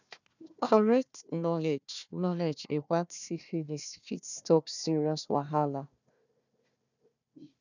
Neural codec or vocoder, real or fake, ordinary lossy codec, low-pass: codec, 16 kHz, 1 kbps, FunCodec, trained on Chinese and English, 50 frames a second; fake; none; 7.2 kHz